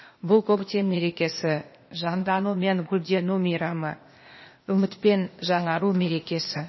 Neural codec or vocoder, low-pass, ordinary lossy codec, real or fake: codec, 16 kHz, 0.8 kbps, ZipCodec; 7.2 kHz; MP3, 24 kbps; fake